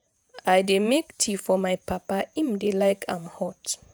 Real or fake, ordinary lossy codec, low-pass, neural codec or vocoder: fake; none; none; vocoder, 48 kHz, 128 mel bands, Vocos